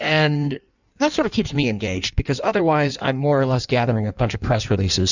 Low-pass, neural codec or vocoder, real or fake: 7.2 kHz; codec, 16 kHz in and 24 kHz out, 1.1 kbps, FireRedTTS-2 codec; fake